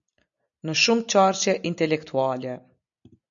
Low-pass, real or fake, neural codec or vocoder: 7.2 kHz; real; none